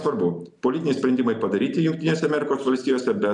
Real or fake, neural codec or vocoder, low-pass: real; none; 10.8 kHz